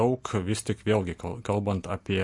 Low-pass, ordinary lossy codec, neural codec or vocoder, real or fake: 10.8 kHz; MP3, 48 kbps; none; real